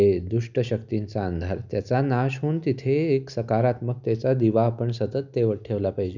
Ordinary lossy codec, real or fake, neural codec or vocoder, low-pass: none; real; none; 7.2 kHz